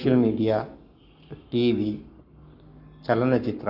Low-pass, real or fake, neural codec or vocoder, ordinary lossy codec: 5.4 kHz; fake; codec, 44.1 kHz, 7.8 kbps, Pupu-Codec; none